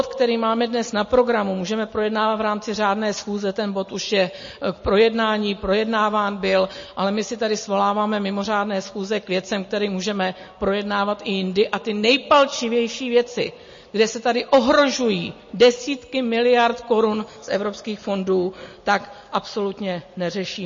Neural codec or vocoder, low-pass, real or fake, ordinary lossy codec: none; 7.2 kHz; real; MP3, 32 kbps